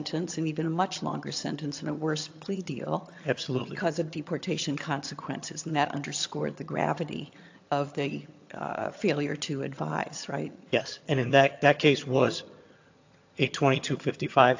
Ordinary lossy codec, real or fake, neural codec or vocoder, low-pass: AAC, 48 kbps; fake; vocoder, 22.05 kHz, 80 mel bands, HiFi-GAN; 7.2 kHz